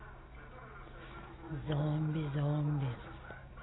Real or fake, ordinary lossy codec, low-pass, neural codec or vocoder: real; AAC, 16 kbps; 7.2 kHz; none